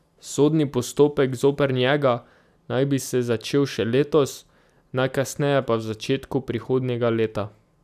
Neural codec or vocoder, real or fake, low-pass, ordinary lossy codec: none; real; 14.4 kHz; none